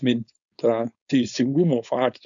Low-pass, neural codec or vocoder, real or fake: 7.2 kHz; codec, 16 kHz, 4.8 kbps, FACodec; fake